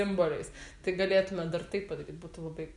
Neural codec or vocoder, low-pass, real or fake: none; 10.8 kHz; real